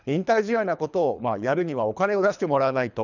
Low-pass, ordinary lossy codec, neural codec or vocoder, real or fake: 7.2 kHz; none; codec, 24 kHz, 3 kbps, HILCodec; fake